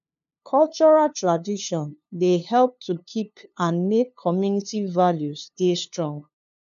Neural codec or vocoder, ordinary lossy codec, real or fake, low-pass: codec, 16 kHz, 2 kbps, FunCodec, trained on LibriTTS, 25 frames a second; none; fake; 7.2 kHz